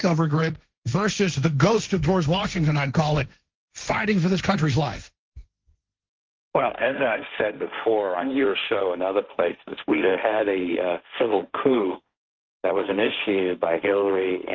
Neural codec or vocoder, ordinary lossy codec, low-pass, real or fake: codec, 16 kHz, 1.1 kbps, Voila-Tokenizer; Opus, 24 kbps; 7.2 kHz; fake